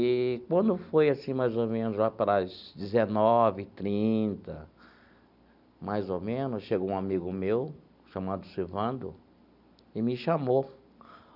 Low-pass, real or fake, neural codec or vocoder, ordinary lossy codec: 5.4 kHz; real; none; none